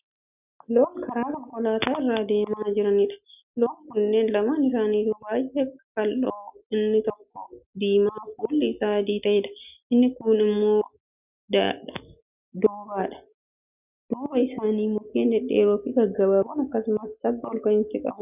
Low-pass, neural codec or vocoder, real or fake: 3.6 kHz; none; real